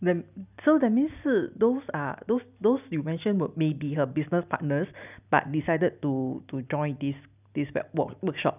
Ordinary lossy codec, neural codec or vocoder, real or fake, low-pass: none; none; real; 3.6 kHz